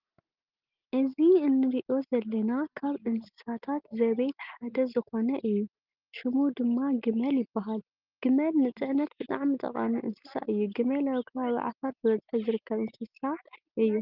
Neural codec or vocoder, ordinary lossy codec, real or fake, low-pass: none; Opus, 24 kbps; real; 5.4 kHz